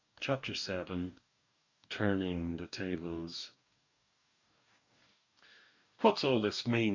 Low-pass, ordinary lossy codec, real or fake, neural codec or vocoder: 7.2 kHz; MP3, 64 kbps; fake; codec, 44.1 kHz, 2.6 kbps, DAC